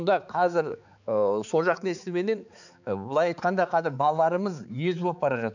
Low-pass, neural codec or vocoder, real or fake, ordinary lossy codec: 7.2 kHz; codec, 16 kHz, 4 kbps, X-Codec, HuBERT features, trained on balanced general audio; fake; none